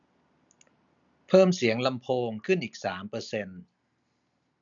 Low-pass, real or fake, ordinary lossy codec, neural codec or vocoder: 7.2 kHz; real; none; none